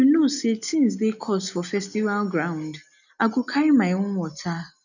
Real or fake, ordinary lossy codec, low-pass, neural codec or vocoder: real; none; 7.2 kHz; none